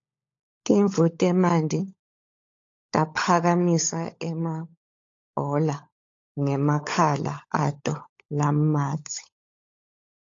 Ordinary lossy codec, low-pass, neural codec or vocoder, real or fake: AAC, 48 kbps; 7.2 kHz; codec, 16 kHz, 16 kbps, FunCodec, trained on LibriTTS, 50 frames a second; fake